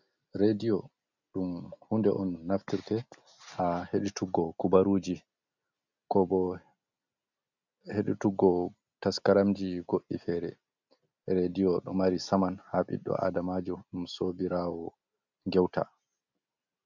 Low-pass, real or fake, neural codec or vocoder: 7.2 kHz; real; none